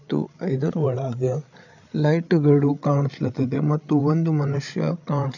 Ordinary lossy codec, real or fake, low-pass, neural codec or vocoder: none; fake; 7.2 kHz; codec, 16 kHz, 8 kbps, FreqCodec, larger model